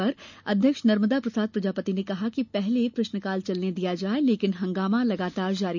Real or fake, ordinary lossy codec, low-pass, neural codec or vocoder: real; none; 7.2 kHz; none